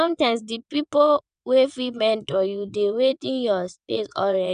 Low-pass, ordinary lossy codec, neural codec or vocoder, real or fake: 9.9 kHz; none; vocoder, 22.05 kHz, 80 mel bands, WaveNeXt; fake